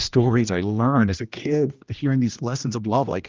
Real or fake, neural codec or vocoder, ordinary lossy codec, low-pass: fake; codec, 16 kHz, 1 kbps, X-Codec, HuBERT features, trained on general audio; Opus, 24 kbps; 7.2 kHz